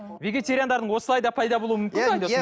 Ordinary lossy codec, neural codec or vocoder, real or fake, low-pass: none; none; real; none